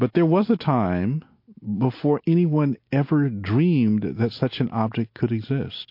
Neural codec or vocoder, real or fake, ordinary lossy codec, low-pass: none; real; MP3, 32 kbps; 5.4 kHz